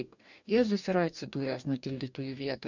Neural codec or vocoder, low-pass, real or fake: codec, 44.1 kHz, 2.6 kbps, DAC; 7.2 kHz; fake